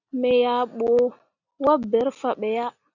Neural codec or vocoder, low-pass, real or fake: none; 7.2 kHz; real